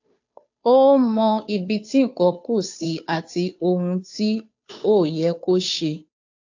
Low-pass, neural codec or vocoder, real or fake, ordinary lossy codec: 7.2 kHz; codec, 16 kHz, 2 kbps, FunCodec, trained on Chinese and English, 25 frames a second; fake; AAC, 48 kbps